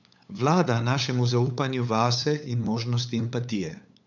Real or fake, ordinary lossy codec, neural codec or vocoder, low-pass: fake; none; codec, 16 kHz, 8 kbps, FunCodec, trained on LibriTTS, 25 frames a second; 7.2 kHz